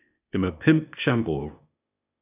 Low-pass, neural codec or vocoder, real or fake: 3.6 kHz; codec, 16 kHz, 0.8 kbps, ZipCodec; fake